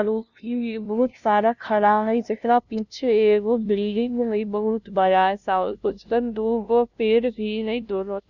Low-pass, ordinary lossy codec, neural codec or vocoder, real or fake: 7.2 kHz; none; codec, 16 kHz, 0.5 kbps, FunCodec, trained on LibriTTS, 25 frames a second; fake